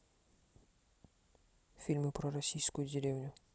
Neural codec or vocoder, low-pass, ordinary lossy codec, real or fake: none; none; none; real